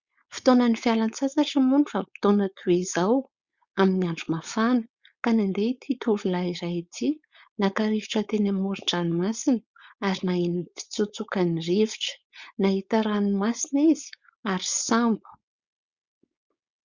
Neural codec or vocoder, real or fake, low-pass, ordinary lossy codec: codec, 16 kHz, 4.8 kbps, FACodec; fake; 7.2 kHz; Opus, 64 kbps